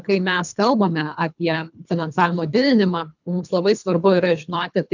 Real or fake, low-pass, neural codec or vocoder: fake; 7.2 kHz; codec, 24 kHz, 3 kbps, HILCodec